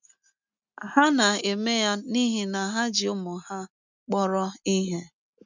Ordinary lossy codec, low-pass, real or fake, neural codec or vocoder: none; 7.2 kHz; real; none